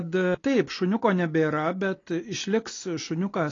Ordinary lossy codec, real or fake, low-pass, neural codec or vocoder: AAC, 32 kbps; real; 7.2 kHz; none